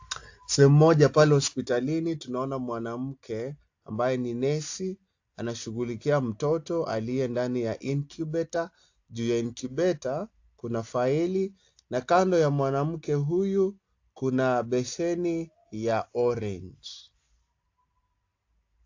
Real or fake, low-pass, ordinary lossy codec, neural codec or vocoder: real; 7.2 kHz; AAC, 48 kbps; none